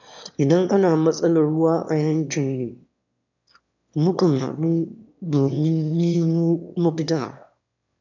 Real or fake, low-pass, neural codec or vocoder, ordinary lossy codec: fake; 7.2 kHz; autoencoder, 22.05 kHz, a latent of 192 numbers a frame, VITS, trained on one speaker; none